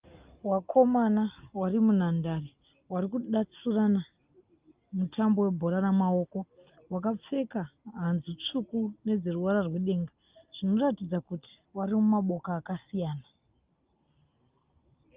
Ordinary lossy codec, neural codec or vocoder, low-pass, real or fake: Opus, 24 kbps; none; 3.6 kHz; real